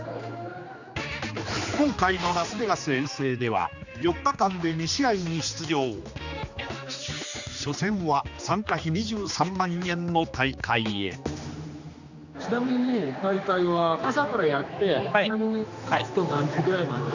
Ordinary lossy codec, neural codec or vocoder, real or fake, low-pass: none; codec, 16 kHz, 2 kbps, X-Codec, HuBERT features, trained on general audio; fake; 7.2 kHz